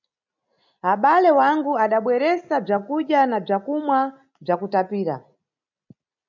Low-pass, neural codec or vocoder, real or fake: 7.2 kHz; none; real